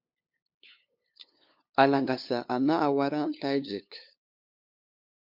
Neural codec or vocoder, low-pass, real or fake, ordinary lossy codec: codec, 16 kHz, 2 kbps, FunCodec, trained on LibriTTS, 25 frames a second; 5.4 kHz; fake; MP3, 48 kbps